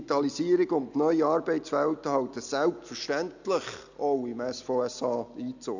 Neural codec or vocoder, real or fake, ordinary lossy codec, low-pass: none; real; none; 7.2 kHz